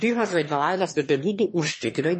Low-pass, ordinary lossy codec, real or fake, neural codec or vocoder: 9.9 kHz; MP3, 32 kbps; fake; autoencoder, 22.05 kHz, a latent of 192 numbers a frame, VITS, trained on one speaker